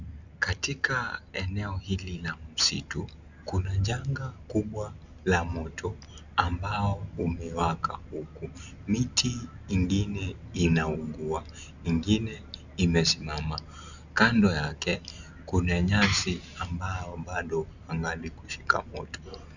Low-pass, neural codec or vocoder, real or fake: 7.2 kHz; none; real